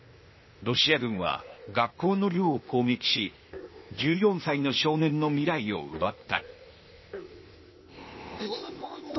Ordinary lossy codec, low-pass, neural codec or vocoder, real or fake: MP3, 24 kbps; 7.2 kHz; codec, 16 kHz, 0.8 kbps, ZipCodec; fake